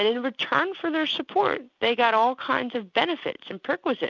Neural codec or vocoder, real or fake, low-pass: none; real; 7.2 kHz